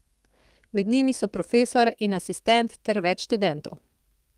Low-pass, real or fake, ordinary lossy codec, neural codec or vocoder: 14.4 kHz; fake; Opus, 32 kbps; codec, 32 kHz, 1.9 kbps, SNAC